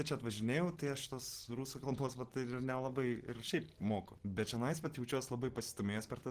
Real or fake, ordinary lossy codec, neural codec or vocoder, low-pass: real; Opus, 16 kbps; none; 14.4 kHz